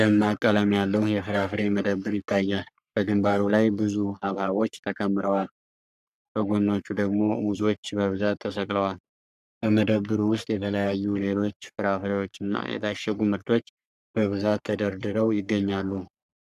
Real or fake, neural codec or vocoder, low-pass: fake; codec, 44.1 kHz, 3.4 kbps, Pupu-Codec; 14.4 kHz